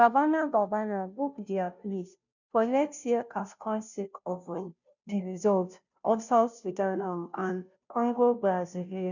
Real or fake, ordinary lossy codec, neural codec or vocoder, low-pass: fake; none; codec, 16 kHz, 0.5 kbps, FunCodec, trained on Chinese and English, 25 frames a second; 7.2 kHz